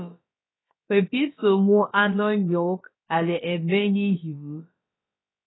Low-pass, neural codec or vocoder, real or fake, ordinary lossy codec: 7.2 kHz; codec, 16 kHz, about 1 kbps, DyCAST, with the encoder's durations; fake; AAC, 16 kbps